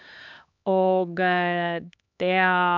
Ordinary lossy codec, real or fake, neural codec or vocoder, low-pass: none; fake; codec, 16 kHz, 2 kbps, X-Codec, HuBERT features, trained on LibriSpeech; 7.2 kHz